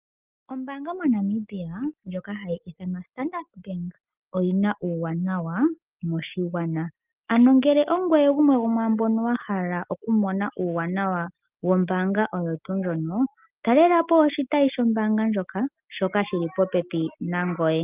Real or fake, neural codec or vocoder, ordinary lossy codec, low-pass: real; none; Opus, 24 kbps; 3.6 kHz